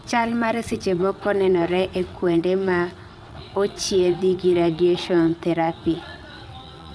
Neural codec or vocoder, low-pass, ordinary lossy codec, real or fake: vocoder, 22.05 kHz, 80 mel bands, WaveNeXt; none; none; fake